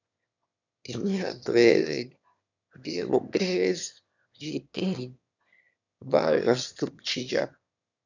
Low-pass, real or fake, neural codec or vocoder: 7.2 kHz; fake; autoencoder, 22.05 kHz, a latent of 192 numbers a frame, VITS, trained on one speaker